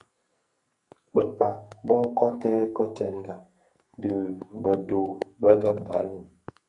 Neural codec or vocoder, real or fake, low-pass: codec, 44.1 kHz, 2.6 kbps, SNAC; fake; 10.8 kHz